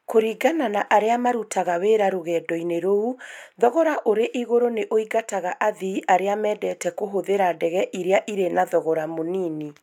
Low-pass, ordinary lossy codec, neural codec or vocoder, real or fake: 19.8 kHz; none; none; real